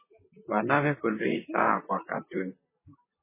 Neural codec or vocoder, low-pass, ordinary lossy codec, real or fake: vocoder, 44.1 kHz, 128 mel bands, Pupu-Vocoder; 3.6 kHz; MP3, 16 kbps; fake